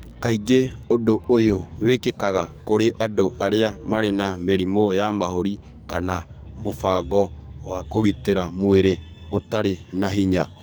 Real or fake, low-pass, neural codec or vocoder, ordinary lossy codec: fake; none; codec, 44.1 kHz, 2.6 kbps, SNAC; none